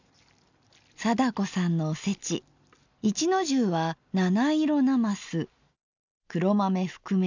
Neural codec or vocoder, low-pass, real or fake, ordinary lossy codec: none; 7.2 kHz; real; none